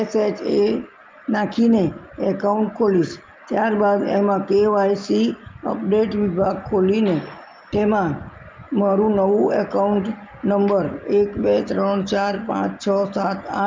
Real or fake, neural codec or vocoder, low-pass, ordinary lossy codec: real; none; 7.2 kHz; Opus, 24 kbps